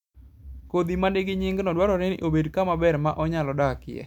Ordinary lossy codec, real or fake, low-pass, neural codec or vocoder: none; real; 19.8 kHz; none